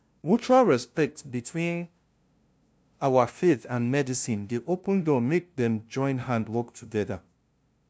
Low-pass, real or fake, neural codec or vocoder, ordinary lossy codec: none; fake; codec, 16 kHz, 0.5 kbps, FunCodec, trained on LibriTTS, 25 frames a second; none